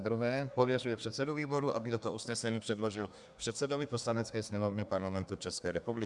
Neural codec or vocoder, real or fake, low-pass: codec, 24 kHz, 1 kbps, SNAC; fake; 10.8 kHz